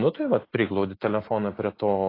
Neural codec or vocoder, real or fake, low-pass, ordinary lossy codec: none; real; 5.4 kHz; AAC, 24 kbps